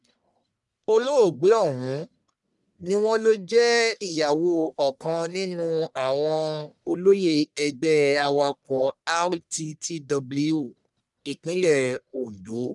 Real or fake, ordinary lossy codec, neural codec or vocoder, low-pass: fake; none; codec, 44.1 kHz, 1.7 kbps, Pupu-Codec; 10.8 kHz